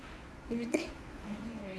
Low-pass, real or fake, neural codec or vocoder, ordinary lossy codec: none; fake; codec, 24 kHz, 0.9 kbps, WavTokenizer, medium speech release version 1; none